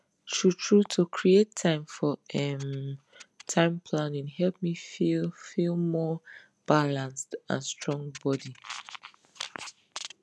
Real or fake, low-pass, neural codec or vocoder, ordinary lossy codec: real; none; none; none